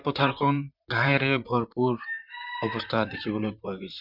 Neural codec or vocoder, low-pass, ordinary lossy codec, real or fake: vocoder, 44.1 kHz, 128 mel bands, Pupu-Vocoder; 5.4 kHz; none; fake